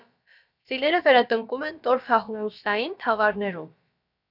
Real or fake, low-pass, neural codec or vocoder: fake; 5.4 kHz; codec, 16 kHz, about 1 kbps, DyCAST, with the encoder's durations